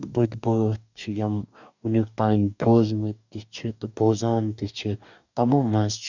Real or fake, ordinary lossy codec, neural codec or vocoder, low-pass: fake; none; codec, 44.1 kHz, 2.6 kbps, DAC; 7.2 kHz